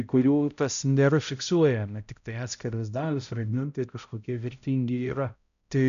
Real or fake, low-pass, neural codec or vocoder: fake; 7.2 kHz; codec, 16 kHz, 0.5 kbps, X-Codec, HuBERT features, trained on balanced general audio